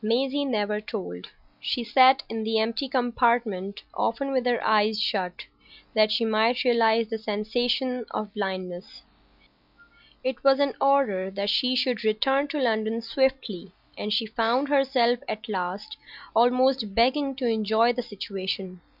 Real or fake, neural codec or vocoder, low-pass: real; none; 5.4 kHz